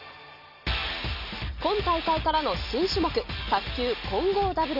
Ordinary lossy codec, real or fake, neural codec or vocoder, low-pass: none; real; none; 5.4 kHz